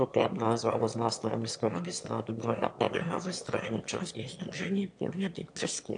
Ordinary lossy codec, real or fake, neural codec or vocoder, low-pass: AAC, 64 kbps; fake; autoencoder, 22.05 kHz, a latent of 192 numbers a frame, VITS, trained on one speaker; 9.9 kHz